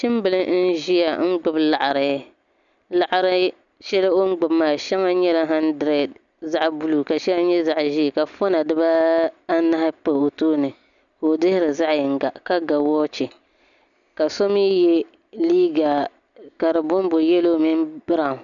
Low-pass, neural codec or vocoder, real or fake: 7.2 kHz; none; real